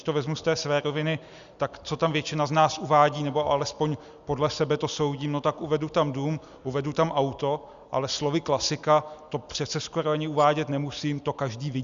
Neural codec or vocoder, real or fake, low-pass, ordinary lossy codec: none; real; 7.2 kHz; Opus, 64 kbps